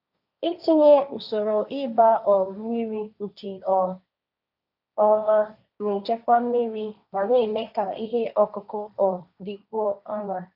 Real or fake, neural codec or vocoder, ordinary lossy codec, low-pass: fake; codec, 16 kHz, 1.1 kbps, Voila-Tokenizer; none; 5.4 kHz